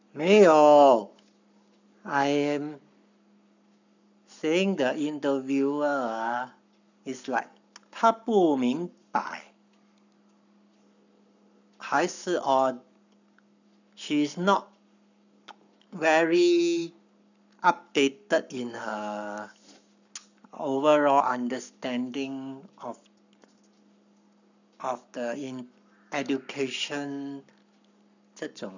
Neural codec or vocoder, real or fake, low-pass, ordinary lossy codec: codec, 44.1 kHz, 7.8 kbps, Pupu-Codec; fake; 7.2 kHz; none